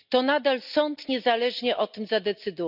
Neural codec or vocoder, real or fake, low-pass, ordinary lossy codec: none; real; 5.4 kHz; none